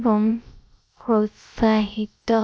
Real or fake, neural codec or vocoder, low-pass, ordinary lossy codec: fake; codec, 16 kHz, about 1 kbps, DyCAST, with the encoder's durations; none; none